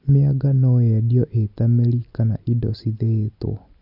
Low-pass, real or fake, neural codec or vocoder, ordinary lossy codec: 5.4 kHz; real; none; none